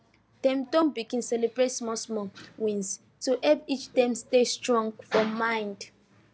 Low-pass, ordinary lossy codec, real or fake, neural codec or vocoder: none; none; real; none